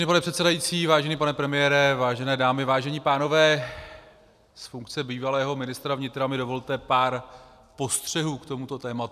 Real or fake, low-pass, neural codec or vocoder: real; 14.4 kHz; none